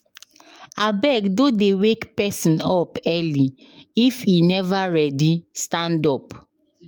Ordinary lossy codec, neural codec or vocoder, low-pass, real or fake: MP3, 96 kbps; codec, 44.1 kHz, 7.8 kbps, DAC; 19.8 kHz; fake